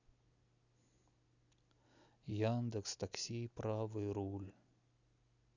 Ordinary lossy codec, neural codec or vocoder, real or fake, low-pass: none; none; real; 7.2 kHz